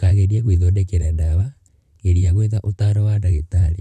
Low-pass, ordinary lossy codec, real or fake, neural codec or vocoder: 14.4 kHz; none; fake; vocoder, 44.1 kHz, 128 mel bands, Pupu-Vocoder